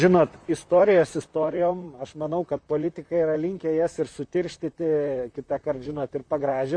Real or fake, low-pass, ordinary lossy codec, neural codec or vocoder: fake; 9.9 kHz; MP3, 64 kbps; vocoder, 44.1 kHz, 128 mel bands, Pupu-Vocoder